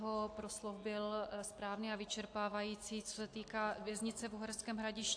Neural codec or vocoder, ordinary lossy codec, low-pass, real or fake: none; AAC, 48 kbps; 9.9 kHz; real